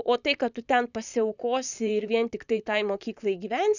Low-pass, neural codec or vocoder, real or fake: 7.2 kHz; vocoder, 44.1 kHz, 128 mel bands, Pupu-Vocoder; fake